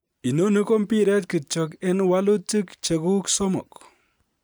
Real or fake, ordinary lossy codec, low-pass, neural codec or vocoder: real; none; none; none